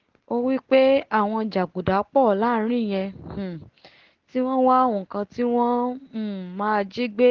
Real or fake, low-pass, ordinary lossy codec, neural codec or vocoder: real; 7.2 kHz; Opus, 16 kbps; none